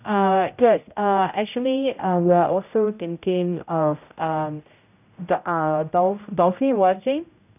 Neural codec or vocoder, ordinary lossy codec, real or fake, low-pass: codec, 16 kHz, 0.5 kbps, X-Codec, HuBERT features, trained on general audio; none; fake; 3.6 kHz